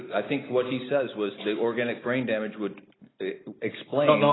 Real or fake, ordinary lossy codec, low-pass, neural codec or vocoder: real; AAC, 16 kbps; 7.2 kHz; none